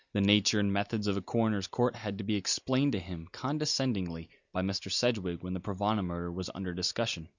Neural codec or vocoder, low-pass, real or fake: none; 7.2 kHz; real